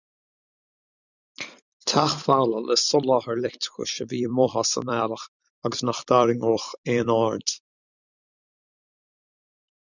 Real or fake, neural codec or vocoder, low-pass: fake; vocoder, 44.1 kHz, 128 mel bands every 256 samples, BigVGAN v2; 7.2 kHz